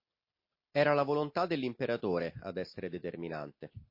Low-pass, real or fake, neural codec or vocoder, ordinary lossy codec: 5.4 kHz; real; none; MP3, 32 kbps